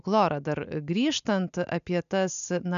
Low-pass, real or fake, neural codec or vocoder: 7.2 kHz; real; none